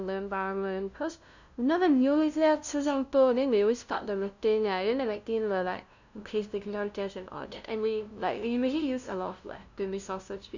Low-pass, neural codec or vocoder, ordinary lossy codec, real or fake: 7.2 kHz; codec, 16 kHz, 0.5 kbps, FunCodec, trained on LibriTTS, 25 frames a second; none; fake